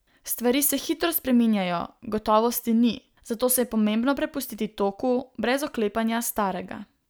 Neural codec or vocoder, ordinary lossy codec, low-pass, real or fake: none; none; none; real